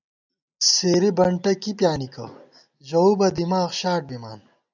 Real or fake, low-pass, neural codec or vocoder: real; 7.2 kHz; none